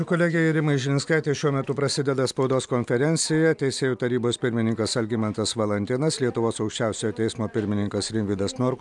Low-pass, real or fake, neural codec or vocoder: 10.8 kHz; real; none